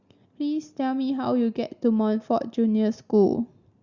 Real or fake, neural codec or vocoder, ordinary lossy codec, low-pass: real; none; Opus, 64 kbps; 7.2 kHz